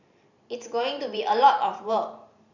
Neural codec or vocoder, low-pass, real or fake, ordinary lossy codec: vocoder, 44.1 kHz, 128 mel bands every 512 samples, BigVGAN v2; 7.2 kHz; fake; none